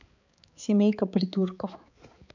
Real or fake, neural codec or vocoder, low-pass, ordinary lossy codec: fake; codec, 16 kHz, 4 kbps, X-Codec, HuBERT features, trained on balanced general audio; 7.2 kHz; none